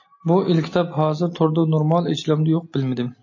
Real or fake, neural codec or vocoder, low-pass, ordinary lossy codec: real; none; 7.2 kHz; MP3, 32 kbps